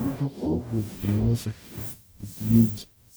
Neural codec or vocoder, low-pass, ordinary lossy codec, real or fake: codec, 44.1 kHz, 0.9 kbps, DAC; none; none; fake